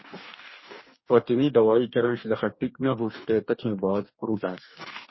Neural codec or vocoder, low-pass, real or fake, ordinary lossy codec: codec, 44.1 kHz, 2.6 kbps, DAC; 7.2 kHz; fake; MP3, 24 kbps